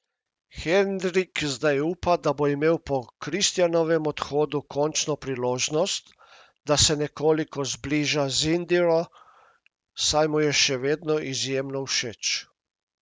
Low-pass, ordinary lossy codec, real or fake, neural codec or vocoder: none; none; real; none